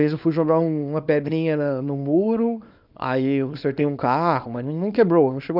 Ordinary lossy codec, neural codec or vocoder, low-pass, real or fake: none; codec, 24 kHz, 0.9 kbps, WavTokenizer, small release; 5.4 kHz; fake